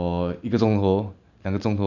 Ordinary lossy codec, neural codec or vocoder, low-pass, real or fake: none; none; 7.2 kHz; real